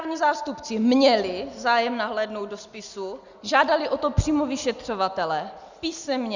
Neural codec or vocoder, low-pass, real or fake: none; 7.2 kHz; real